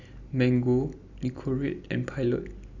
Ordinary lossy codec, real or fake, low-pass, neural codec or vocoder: Opus, 64 kbps; real; 7.2 kHz; none